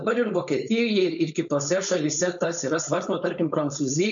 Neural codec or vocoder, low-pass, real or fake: codec, 16 kHz, 4.8 kbps, FACodec; 7.2 kHz; fake